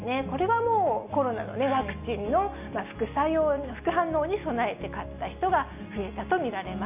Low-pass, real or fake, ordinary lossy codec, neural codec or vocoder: 3.6 kHz; real; none; none